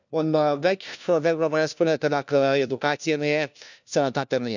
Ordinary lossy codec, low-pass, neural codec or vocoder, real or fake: none; 7.2 kHz; codec, 16 kHz, 1 kbps, FunCodec, trained on LibriTTS, 50 frames a second; fake